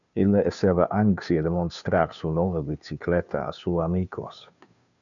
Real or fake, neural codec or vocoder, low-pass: fake; codec, 16 kHz, 2 kbps, FunCodec, trained on Chinese and English, 25 frames a second; 7.2 kHz